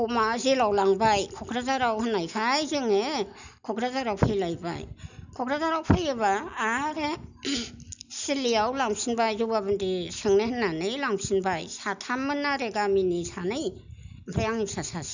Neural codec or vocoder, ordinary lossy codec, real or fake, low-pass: none; none; real; 7.2 kHz